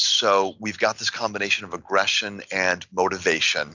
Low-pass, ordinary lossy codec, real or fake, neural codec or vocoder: 7.2 kHz; Opus, 64 kbps; real; none